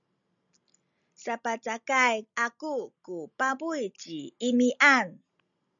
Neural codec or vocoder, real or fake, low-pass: none; real; 7.2 kHz